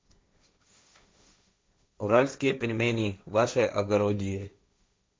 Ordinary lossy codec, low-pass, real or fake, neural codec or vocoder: none; none; fake; codec, 16 kHz, 1.1 kbps, Voila-Tokenizer